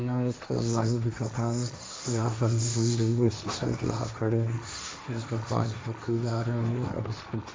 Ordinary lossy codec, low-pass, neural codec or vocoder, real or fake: none; none; codec, 16 kHz, 1.1 kbps, Voila-Tokenizer; fake